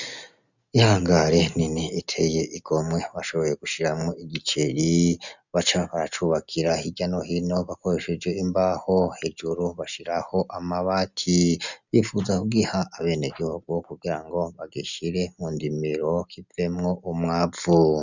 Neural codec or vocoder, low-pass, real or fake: none; 7.2 kHz; real